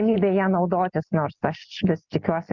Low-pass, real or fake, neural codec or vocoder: 7.2 kHz; real; none